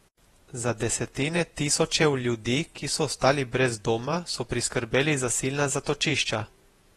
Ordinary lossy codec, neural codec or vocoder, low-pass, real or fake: AAC, 32 kbps; vocoder, 48 kHz, 128 mel bands, Vocos; 19.8 kHz; fake